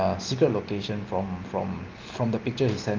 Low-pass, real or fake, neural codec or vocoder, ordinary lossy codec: 7.2 kHz; real; none; Opus, 32 kbps